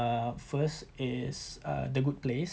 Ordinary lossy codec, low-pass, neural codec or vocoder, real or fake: none; none; none; real